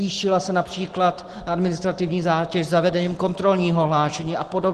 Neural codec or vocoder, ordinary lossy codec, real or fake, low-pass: none; Opus, 16 kbps; real; 10.8 kHz